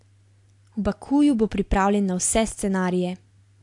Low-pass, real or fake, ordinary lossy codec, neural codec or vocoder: 10.8 kHz; real; AAC, 64 kbps; none